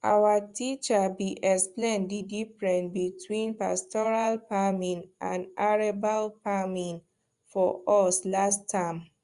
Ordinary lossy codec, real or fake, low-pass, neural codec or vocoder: Opus, 64 kbps; fake; 10.8 kHz; vocoder, 24 kHz, 100 mel bands, Vocos